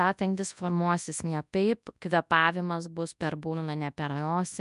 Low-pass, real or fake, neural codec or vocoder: 10.8 kHz; fake; codec, 24 kHz, 0.9 kbps, WavTokenizer, large speech release